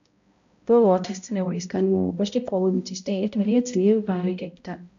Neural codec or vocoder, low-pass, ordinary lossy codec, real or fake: codec, 16 kHz, 0.5 kbps, X-Codec, HuBERT features, trained on balanced general audio; 7.2 kHz; AAC, 64 kbps; fake